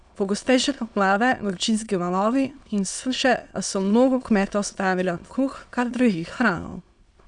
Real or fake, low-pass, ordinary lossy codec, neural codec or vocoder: fake; 9.9 kHz; none; autoencoder, 22.05 kHz, a latent of 192 numbers a frame, VITS, trained on many speakers